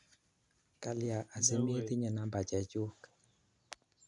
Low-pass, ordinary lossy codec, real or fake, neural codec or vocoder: 10.8 kHz; none; real; none